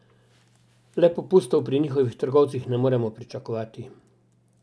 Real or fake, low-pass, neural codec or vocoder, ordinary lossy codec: real; none; none; none